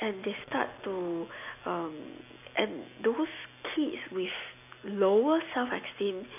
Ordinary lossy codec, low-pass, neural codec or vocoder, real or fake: none; 3.6 kHz; none; real